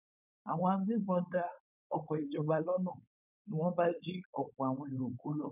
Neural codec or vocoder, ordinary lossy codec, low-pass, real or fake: codec, 16 kHz, 4.8 kbps, FACodec; none; 3.6 kHz; fake